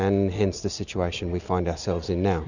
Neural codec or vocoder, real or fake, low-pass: none; real; 7.2 kHz